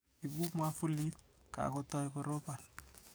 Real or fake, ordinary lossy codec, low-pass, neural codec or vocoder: fake; none; none; codec, 44.1 kHz, 7.8 kbps, DAC